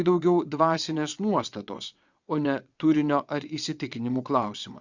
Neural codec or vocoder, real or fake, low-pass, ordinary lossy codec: vocoder, 22.05 kHz, 80 mel bands, WaveNeXt; fake; 7.2 kHz; Opus, 64 kbps